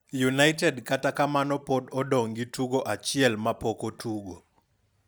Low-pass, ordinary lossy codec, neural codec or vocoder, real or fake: none; none; none; real